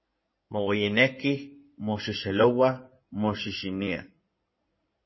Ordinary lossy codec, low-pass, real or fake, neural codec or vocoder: MP3, 24 kbps; 7.2 kHz; fake; codec, 16 kHz in and 24 kHz out, 2.2 kbps, FireRedTTS-2 codec